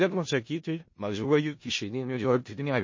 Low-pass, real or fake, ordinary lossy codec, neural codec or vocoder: 7.2 kHz; fake; MP3, 32 kbps; codec, 16 kHz in and 24 kHz out, 0.4 kbps, LongCat-Audio-Codec, four codebook decoder